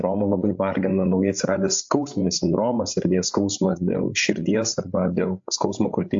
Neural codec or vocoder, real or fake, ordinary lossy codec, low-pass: codec, 16 kHz, 8 kbps, FreqCodec, larger model; fake; AAC, 64 kbps; 7.2 kHz